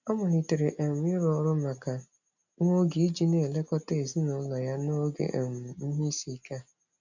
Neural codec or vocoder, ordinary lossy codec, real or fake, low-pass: none; none; real; 7.2 kHz